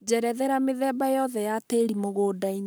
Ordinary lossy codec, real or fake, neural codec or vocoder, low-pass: none; fake; codec, 44.1 kHz, 7.8 kbps, Pupu-Codec; none